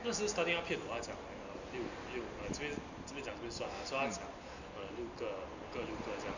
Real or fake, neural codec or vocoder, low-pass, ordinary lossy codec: real; none; 7.2 kHz; none